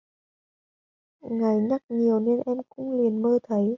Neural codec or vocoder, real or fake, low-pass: none; real; 7.2 kHz